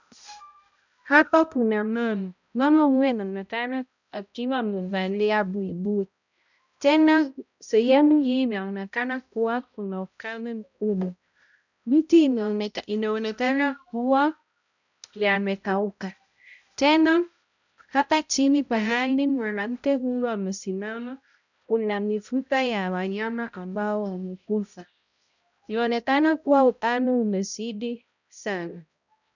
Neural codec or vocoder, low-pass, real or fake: codec, 16 kHz, 0.5 kbps, X-Codec, HuBERT features, trained on balanced general audio; 7.2 kHz; fake